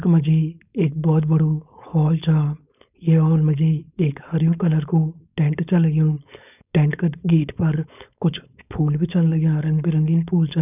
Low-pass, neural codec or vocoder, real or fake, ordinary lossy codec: 3.6 kHz; codec, 16 kHz, 4.8 kbps, FACodec; fake; none